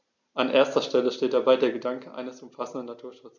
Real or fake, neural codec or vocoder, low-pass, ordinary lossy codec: real; none; 7.2 kHz; MP3, 64 kbps